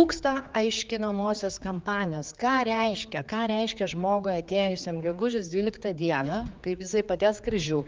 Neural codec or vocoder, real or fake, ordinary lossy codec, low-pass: codec, 16 kHz, 4 kbps, X-Codec, HuBERT features, trained on general audio; fake; Opus, 24 kbps; 7.2 kHz